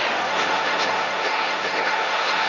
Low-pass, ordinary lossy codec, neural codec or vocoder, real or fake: 7.2 kHz; none; codec, 16 kHz, 1.1 kbps, Voila-Tokenizer; fake